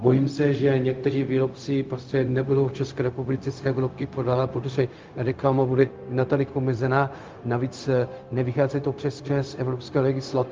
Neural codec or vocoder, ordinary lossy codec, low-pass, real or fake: codec, 16 kHz, 0.4 kbps, LongCat-Audio-Codec; Opus, 32 kbps; 7.2 kHz; fake